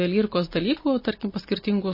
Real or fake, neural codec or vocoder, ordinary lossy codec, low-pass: real; none; MP3, 24 kbps; 5.4 kHz